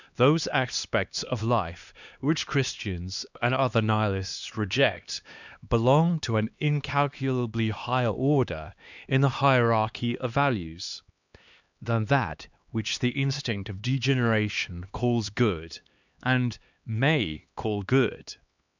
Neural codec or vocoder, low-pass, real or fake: codec, 16 kHz, 2 kbps, X-Codec, HuBERT features, trained on LibriSpeech; 7.2 kHz; fake